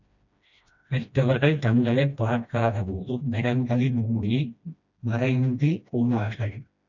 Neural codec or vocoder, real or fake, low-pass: codec, 16 kHz, 1 kbps, FreqCodec, smaller model; fake; 7.2 kHz